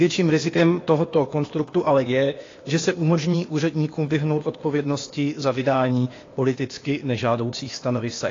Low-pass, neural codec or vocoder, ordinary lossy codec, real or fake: 7.2 kHz; codec, 16 kHz, 0.8 kbps, ZipCodec; AAC, 32 kbps; fake